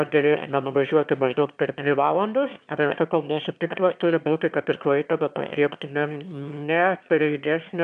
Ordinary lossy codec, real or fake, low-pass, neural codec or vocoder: MP3, 96 kbps; fake; 9.9 kHz; autoencoder, 22.05 kHz, a latent of 192 numbers a frame, VITS, trained on one speaker